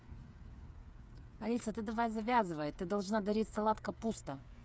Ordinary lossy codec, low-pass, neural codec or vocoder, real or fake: none; none; codec, 16 kHz, 8 kbps, FreqCodec, smaller model; fake